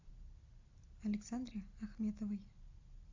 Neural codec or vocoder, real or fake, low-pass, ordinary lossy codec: none; real; 7.2 kHz; AAC, 48 kbps